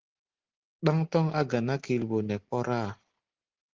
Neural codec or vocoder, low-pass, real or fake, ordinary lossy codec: none; 7.2 kHz; real; Opus, 16 kbps